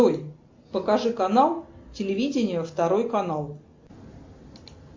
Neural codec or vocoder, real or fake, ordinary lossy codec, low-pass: none; real; MP3, 48 kbps; 7.2 kHz